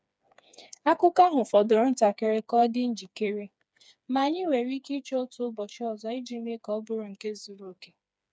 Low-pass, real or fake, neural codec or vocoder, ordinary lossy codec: none; fake; codec, 16 kHz, 4 kbps, FreqCodec, smaller model; none